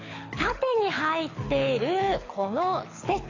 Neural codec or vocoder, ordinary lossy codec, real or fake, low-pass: codec, 24 kHz, 6 kbps, HILCodec; AAC, 32 kbps; fake; 7.2 kHz